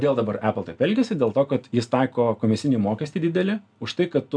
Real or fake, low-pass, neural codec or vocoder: real; 9.9 kHz; none